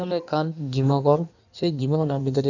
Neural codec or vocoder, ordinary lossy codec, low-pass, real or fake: codec, 16 kHz in and 24 kHz out, 1.1 kbps, FireRedTTS-2 codec; none; 7.2 kHz; fake